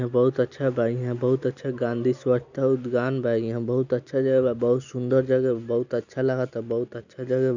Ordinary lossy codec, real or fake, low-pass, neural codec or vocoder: none; real; 7.2 kHz; none